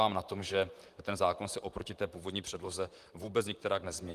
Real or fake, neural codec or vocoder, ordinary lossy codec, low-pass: fake; vocoder, 44.1 kHz, 128 mel bands, Pupu-Vocoder; Opus, 32 kbps; 14.4 kHz